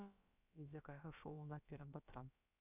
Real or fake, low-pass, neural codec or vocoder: fake; 3.6 kHz; codec, 16 kHz, about 1 kbps, DyCAST, with the encoder's durations